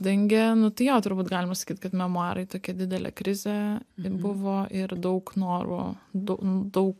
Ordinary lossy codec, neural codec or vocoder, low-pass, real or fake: MP3, 96 kbps; none; 14.4 kHz; real